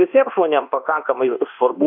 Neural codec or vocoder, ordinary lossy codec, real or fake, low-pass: codec, 24 kHz, 1.2 kbps, DualCodec; AAC, 48 kbps; fake; 5.4 kHz